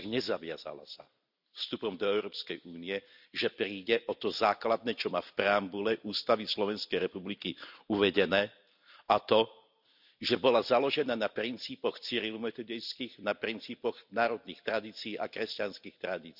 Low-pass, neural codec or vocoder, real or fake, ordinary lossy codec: 5.4 kHz; none; real; none